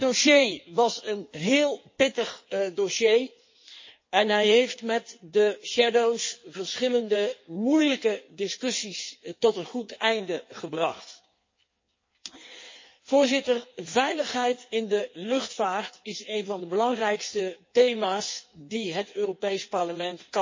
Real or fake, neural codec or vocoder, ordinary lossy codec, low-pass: fake; codec, 16 kHz in and 24 kHz out, 1.1 kbps, FireRedTTS-2 codec; MP3, 32 kbps; 7.2 kHz